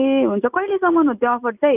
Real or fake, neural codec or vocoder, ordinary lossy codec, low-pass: real; none; none; 3.6 kHz